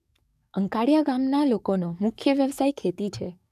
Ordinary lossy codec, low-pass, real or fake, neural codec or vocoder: none; 14.4 kHz; fake; codec, 44.1 kHz, 7.8 kbps, DAC